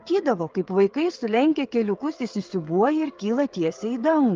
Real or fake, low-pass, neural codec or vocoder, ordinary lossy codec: fake; 7.2 kHz; codec, 16 kHz, 8 kbps, FreqCodec, smaller model; Opus, 24 kbps